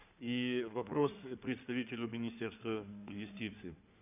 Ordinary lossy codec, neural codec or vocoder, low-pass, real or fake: none; codec, 16 kHz in and 24 kHz out, 2.2 kbps, FireRedTTS-2 codec; 3.6 kHz; fake